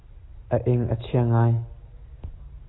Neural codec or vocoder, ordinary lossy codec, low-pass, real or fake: none; AAC, 16 kbps; 7.2 kHz; real